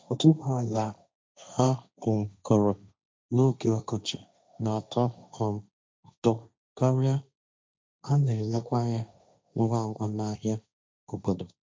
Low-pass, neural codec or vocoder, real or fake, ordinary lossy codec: none; codec, 16 kHz, 1.1 kbps, Voila-Tokenizer; fake; none